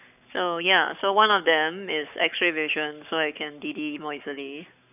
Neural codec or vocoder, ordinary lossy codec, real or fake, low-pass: codec, 44.1 kHz, 7.8 kbps, Pupu-Codec; none; fake; 3.6 kHz